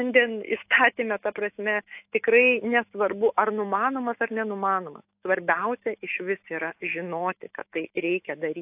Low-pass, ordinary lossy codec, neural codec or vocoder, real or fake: 3.6 kHz; AAC, 32 kbps; autoencoder, 48 kHz, 128 numbers a frame, DAC-VAE, trained on Japanese speech; fake